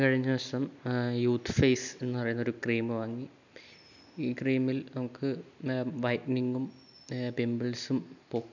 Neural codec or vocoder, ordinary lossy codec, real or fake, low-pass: none; none; real; 7.2 kHz